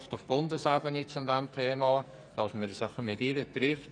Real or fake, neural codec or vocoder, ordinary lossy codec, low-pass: fake; codec, 44.1 kHz, 2.6 kbps, SNAC; none; 9.9 kHz